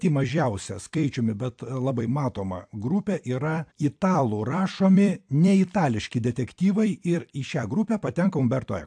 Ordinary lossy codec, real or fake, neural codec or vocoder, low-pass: AAC, 64 kbps; fake; vocoder, 44.1 kHz, 128 mel bands every 256 samples, BigVGAN v2; 9.9 kHz